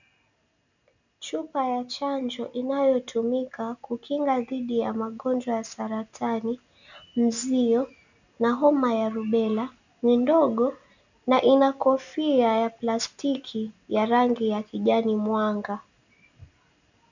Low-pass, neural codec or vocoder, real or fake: 7.2 kHz; none; real